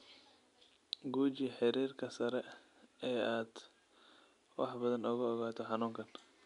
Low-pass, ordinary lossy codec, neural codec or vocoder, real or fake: 10.8 kHz; none; none; real